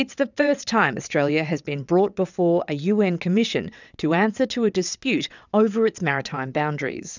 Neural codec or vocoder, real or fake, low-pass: vocoder, 22.05 kHz, 80 mel bands, WaveNeXt; fake; 7.2 kHz